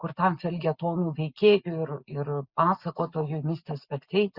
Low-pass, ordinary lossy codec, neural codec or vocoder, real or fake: 5.4 kHz; Opus, 64 kbps; none; real